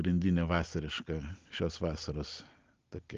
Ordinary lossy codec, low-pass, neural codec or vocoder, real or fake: Opus, 32 kbps; 7.2 kHz; none; real